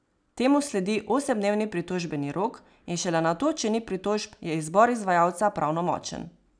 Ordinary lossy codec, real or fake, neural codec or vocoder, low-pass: none; real; none; 9.9 kHz